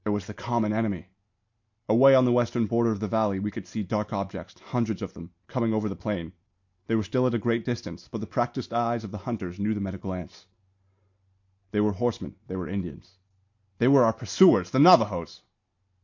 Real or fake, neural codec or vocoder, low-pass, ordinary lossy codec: real; none; 7.2 kHz; MP3, 48 kbps